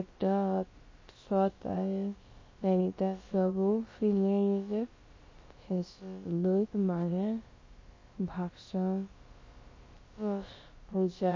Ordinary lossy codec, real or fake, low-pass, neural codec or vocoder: MP3, 32 kbps; fake; 7.2 kHz; codec, 16 kHz, about 1 kbps, DyCAST, with the encoder's durations